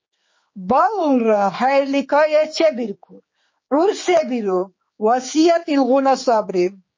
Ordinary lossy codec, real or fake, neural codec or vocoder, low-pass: MP3, 32 kbps; fake; codec, 16 kHz, 4 kbps, X-Codec, HuBERT features, trained on general audio; 7.2 kHz